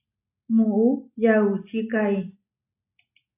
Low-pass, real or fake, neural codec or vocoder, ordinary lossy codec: 3.6 kHz; real; none; AAC, 24 kbps